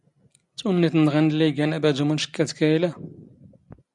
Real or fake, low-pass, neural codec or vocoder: real; 10.8 kHz; none